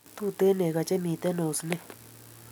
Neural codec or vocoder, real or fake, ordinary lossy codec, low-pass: none; real; none; none